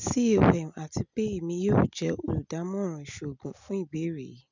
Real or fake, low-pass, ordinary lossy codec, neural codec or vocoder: real; 7.2 kHz; none; none